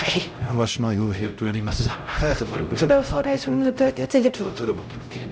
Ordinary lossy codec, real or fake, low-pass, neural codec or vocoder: none; fake; none; codec, 16 kHz, 0.5 kbps, X-Codec, HuBERT features, trained on LibriSpeech